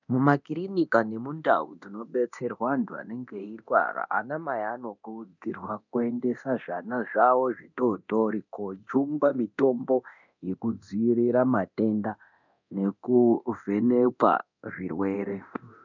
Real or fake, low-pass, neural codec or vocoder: fake; 7.2 kHz; codec, 24 kHz, 0.9 kbps, DualCodec